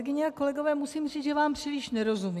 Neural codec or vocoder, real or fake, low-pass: none; real; 14.4 kHz